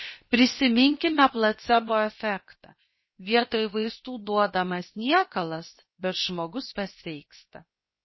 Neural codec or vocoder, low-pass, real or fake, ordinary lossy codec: codec, 16 kHz, 0.7 kbps, FocalCodec; 7.2 kHz; fake; MP3, 24 kbps